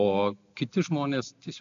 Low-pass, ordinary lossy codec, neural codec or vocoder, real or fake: 7.2 kHz; AAC, 64 kbps; none; real